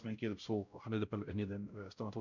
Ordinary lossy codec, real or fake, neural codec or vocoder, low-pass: none; fake; codec, 16 kHz, 0.5 kbps, X-Codec, WavLM features, trained on Multilingual LibriSpeech; 7.2 kHz